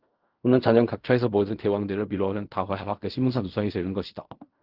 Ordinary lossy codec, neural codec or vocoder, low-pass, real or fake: Opus, 24 kbps; codec, 16 kHz in and 24 kHz out, 0.4 kbps, LongCat-Audio-Codec, fine tuned four codebook decoder; 5.4 kHz; fake